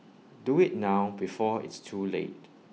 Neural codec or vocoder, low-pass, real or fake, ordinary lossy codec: none; none; real; none